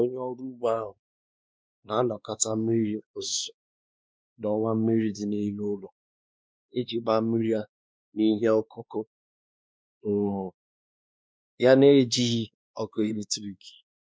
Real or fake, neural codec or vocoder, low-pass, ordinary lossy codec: fake; codec, 16 kHz, 2 kbps, X-Codec, WavLM features, trained on Multilingual LibriSpeech; none; none